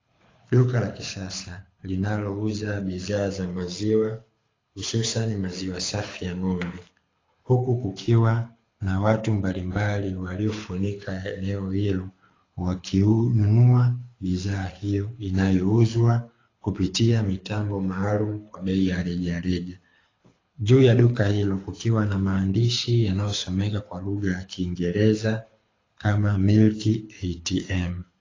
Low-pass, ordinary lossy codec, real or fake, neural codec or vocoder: 7.2 kHz; AAC, 32 kbps; fake; codec, 24 kHz, 6 kbps, HILCodec